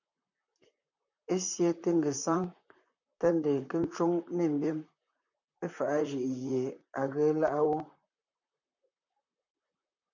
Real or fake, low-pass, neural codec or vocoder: fake; 7.2 kHz; vocoder, 44.1 kHz, 128 mel bands, Pupu-Vocoder